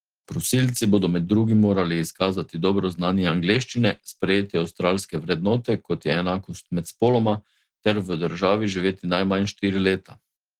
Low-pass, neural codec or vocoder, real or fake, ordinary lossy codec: 19.8 kHz; none; real; Opus, 16 kbps